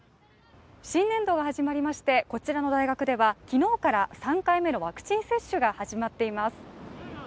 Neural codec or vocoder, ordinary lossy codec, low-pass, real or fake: none; none; none; real